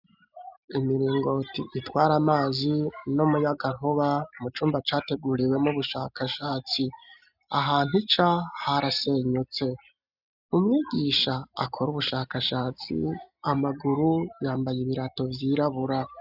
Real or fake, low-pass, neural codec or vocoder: real; 5.4 kHz; none